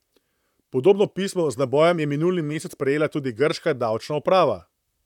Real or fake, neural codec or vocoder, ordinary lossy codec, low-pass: fake; vocoder, 44.1 kHz, 128 mel bands, Pupu-Vocoder; none; 19.8 kHz